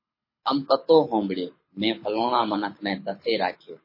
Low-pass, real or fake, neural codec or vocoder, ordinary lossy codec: 5.4 kHz; fake; codec, 24 kHz, 6 kbps, HILCodec; MP3, 24 kbps